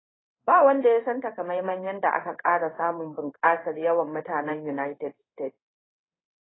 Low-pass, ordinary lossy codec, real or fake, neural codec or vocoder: 7.2 kHz; AAC, 16 kbps; fake; codec, 16 kHz in and 24 kHz out, 1 kbps, XY-Tokenizer